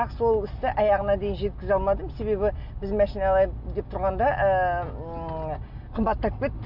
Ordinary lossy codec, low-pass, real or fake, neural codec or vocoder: none; 5.4 kHz; real; none